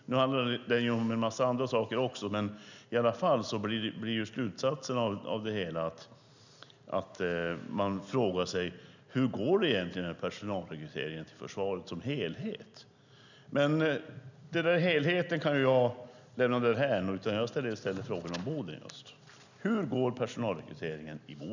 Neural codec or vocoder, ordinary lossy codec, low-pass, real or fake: none; none; 7.2 kHz; real